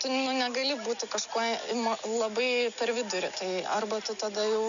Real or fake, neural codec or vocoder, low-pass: real; none; 7.2 kHz